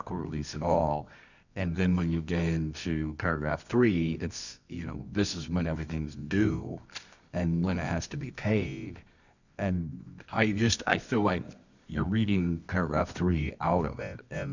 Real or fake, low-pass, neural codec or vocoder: fake; 7.2 kHz; codec, 24 kHz, 0.9 kbps, WavTokenizer, medium music audio release